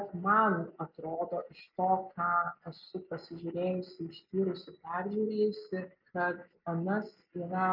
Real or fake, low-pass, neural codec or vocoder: real; 5.4 kHz; none